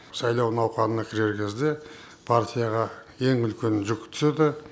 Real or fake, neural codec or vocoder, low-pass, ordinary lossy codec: real; none; none; none